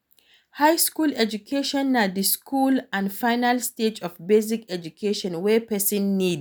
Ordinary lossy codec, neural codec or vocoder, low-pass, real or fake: none; none; none; real